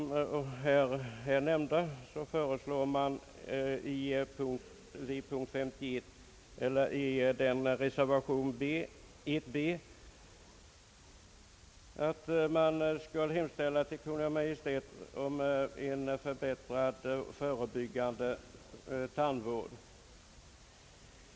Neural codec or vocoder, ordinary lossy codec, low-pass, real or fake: none; none; none; real